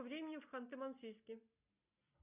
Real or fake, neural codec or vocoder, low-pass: real; none; 3.6 kHz